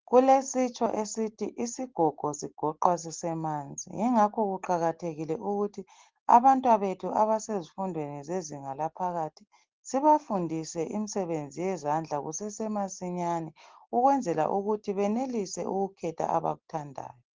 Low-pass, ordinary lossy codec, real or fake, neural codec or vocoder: 7.2 kHz; Opus, 16 kbps; real; none